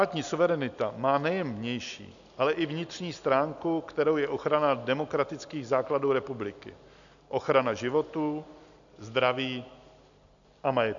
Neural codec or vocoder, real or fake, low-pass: none; real; 7.2 kHz